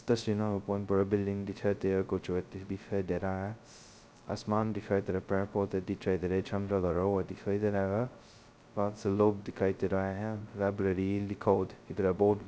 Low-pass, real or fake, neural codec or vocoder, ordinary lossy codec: none; fake; codec, 16 kHz, 0.2 kbps, FocalCodec; none